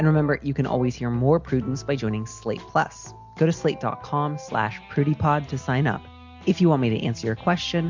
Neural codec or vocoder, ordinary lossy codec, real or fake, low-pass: none; MP3, 64 kbps; real; 7.2 kHz